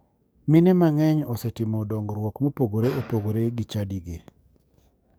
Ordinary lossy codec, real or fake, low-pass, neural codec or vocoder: none; fake; none; codec, 44.1 kHz, 7.8 kbps, DAC